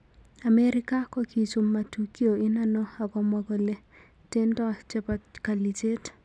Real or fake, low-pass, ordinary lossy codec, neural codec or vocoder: real; none; none; none